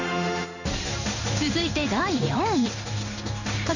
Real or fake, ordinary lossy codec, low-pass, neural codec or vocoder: fake; none; 7.2 kHz; codec, 16 kHz in and 24 kHz out, 1 kbps, XY-Tokenizer